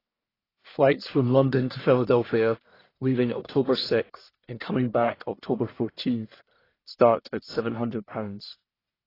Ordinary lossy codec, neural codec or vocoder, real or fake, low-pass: AAC, 24 kbps; codec, 44.1 kHz, 1.7 kbps, Pupu-Codec; fake; 5.4 kHz